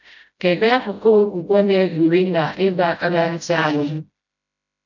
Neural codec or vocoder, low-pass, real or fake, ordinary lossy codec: codec, 16 kHz, 0.5 kbps, FreqCodec, smaller model; 7.2 kHz; fake; none